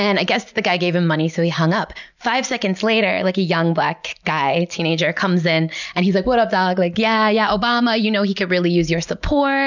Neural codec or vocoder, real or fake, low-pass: none; real; 7.2 kHz